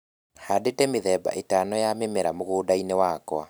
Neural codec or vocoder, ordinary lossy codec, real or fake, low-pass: none; none; real; none